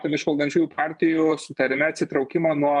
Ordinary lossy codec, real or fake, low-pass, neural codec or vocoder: MP3, 96 kbps; fake; 10.8 kHz; vocoder, 24 kHz, 100 mel bands, Vocos